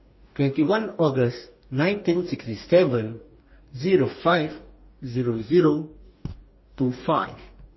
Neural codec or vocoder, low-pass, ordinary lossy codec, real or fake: codec, 44.1 kHz, 2.6 kbps, DAC; 7.2 kHz; MP3, 24 kbps; fake